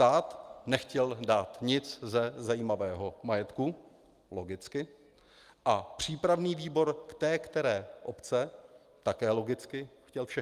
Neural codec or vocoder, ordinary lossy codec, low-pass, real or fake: none; Opus, 32 kbps; 14.4 kHz; real